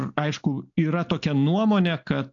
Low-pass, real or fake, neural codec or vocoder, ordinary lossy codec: 7.2 kHz; real; none; AAC, 48 kbps